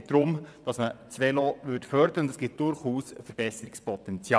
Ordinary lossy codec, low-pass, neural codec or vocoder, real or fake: none; none; vocoder, 22.05 kHz, 80 mel bands, WaveNeXt; fake